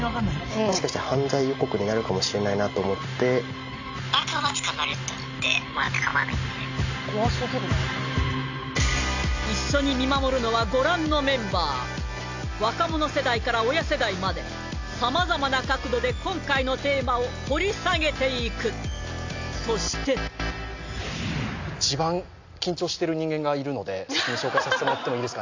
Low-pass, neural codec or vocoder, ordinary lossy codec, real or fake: 7.2 kHz; none; AAC, 48 kbps; real